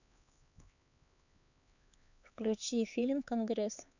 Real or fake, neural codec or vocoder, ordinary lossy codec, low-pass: fake; codec, 16 kHz, 4 kbps, X-Codec, HuBERT features, trained on LibriSpeech; none; 7.2 kHz